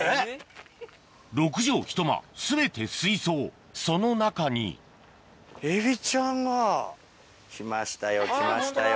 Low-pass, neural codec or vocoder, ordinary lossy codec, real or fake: none; none; none; real